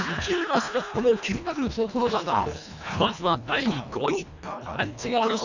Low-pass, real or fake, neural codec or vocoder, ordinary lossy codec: 7.2 kHz; fake; codec, 24 kHz, 1.5 kbps, HILCodec; none